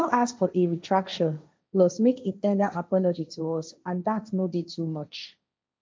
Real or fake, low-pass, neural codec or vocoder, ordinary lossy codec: fake; none; codec, 16 kHz, 1.1 kbps, Voila-Tokenizer; none